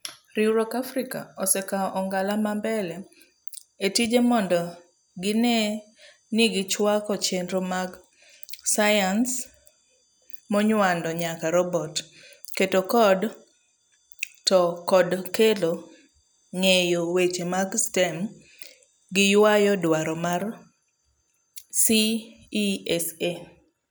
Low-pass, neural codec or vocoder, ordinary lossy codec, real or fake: none; none; none; real